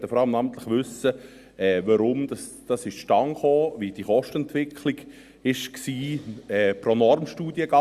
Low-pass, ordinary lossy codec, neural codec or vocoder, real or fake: 14.4 kHz; Opus, 64 kbps; none; real